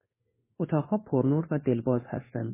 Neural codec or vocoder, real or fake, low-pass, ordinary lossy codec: codec, 16 kHz in and 24 kHz out, 1 kbps, XY-Tokenizer; fake; 3.6 kHz; MP3, 16 kbps